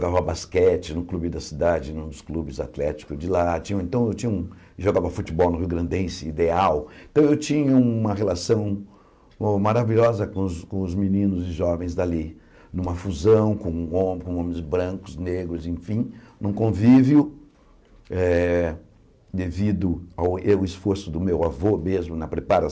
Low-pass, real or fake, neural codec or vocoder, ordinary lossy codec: none; real; none; none